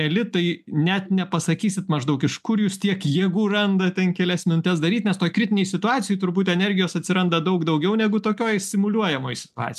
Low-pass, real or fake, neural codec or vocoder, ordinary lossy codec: 14.4 kHz; real; none; MP3, 96 kbps